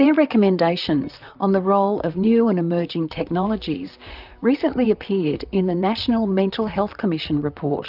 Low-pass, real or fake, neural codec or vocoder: 5.4 kHz; fake; vocoder, 44.1 kHz, 128 mel bands, Pupu-Vocoder